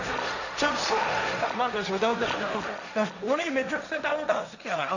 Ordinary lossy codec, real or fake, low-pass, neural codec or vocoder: none; fake; 7.2 kHz; codec, 16 kHz, 1.1 kbps, Voila-Tokenizer